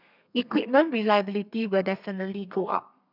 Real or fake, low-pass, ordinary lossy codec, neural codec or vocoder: fake; 5.4 kHz; none; codec, 32 kHz, 1.9 kbps, SNAC